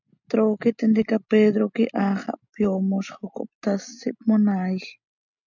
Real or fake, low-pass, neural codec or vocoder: real; 7.2 kHz; none